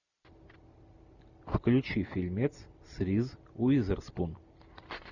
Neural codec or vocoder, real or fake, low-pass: none; real; 7.2 kHz